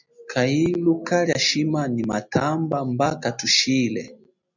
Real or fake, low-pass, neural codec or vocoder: real; 7.2 kHz; none